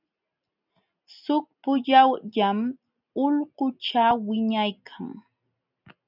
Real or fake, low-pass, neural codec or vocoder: real; 5.4 kHz; none